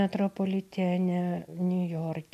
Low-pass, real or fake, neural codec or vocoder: 14.4 kHz; real; none